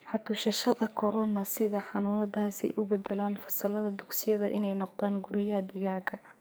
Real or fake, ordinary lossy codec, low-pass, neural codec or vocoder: fake; none; none; codec, 44.1 kHz, 2.6 kbps, SNAC